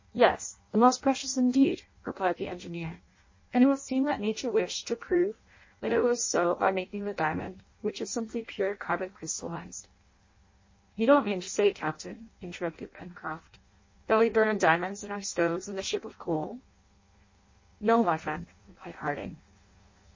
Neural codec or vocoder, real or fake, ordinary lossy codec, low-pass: codec, 16 kHz in and 24 kHz out, 0.6 kbps, FireRedTTS-2 codec; fake; MP3, 32 kbps; 7.2 kHz